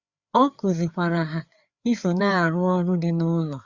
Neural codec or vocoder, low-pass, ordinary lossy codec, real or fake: codec, 16 kHz, 4 kbps, FreqCodec, larger model; 7.2 kHz; Opus, 64 kbps; fake